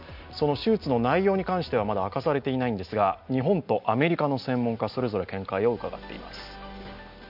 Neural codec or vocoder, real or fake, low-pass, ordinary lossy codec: none; real; 5.4 kHz; none